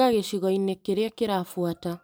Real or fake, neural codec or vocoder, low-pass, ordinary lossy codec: fake; vocoder, 44.1 kHz, 128 mel bands every 256 samples, BigVGAN v2; none; none